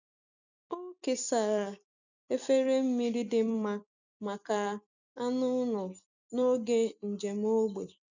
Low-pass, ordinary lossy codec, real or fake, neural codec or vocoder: 7.2 kHz; none; real; none